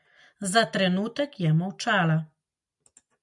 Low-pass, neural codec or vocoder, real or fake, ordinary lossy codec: 10.8 kHz; none; real; MP3, 96 kbps